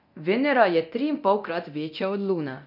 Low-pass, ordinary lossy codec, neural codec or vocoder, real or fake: 5.4 kHz; none; codec, 24 kHz, 0.9 kbps, DualCodec; fake